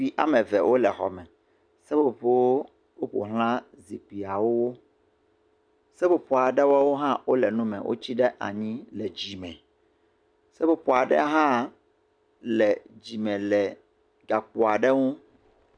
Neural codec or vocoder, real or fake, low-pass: none; real; 9.9 kHz